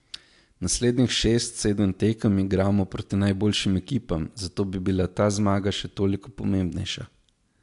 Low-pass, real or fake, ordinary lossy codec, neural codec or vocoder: 10.8 kHz; real; AAC, 64 kbps; none